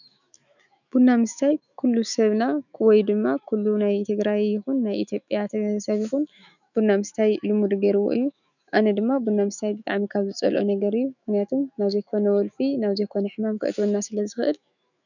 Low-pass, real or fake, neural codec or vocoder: 7.2 kHz; fake; autoencoder, 48 kHz, 128 numbers a frame, DAC-VAE, trained on Japanese speech